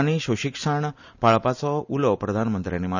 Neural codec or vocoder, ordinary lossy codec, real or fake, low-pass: none; none; real; 7.2 kHz